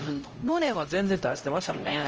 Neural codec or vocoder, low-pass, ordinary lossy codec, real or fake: codec, 16 kHz, 0.5 kbps, X-Codec, HuBERT features, trained on LibriSpeech; 7.2 kHz; Opus, 24 kbps; fake